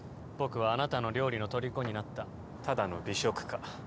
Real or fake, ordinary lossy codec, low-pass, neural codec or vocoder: real; none; none; none